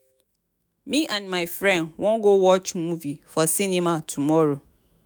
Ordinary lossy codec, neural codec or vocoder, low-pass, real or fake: none; autoencoder, 48 kHz, 128 numbers a frame, DAC-VAE, trained on Japanese speech; none; fake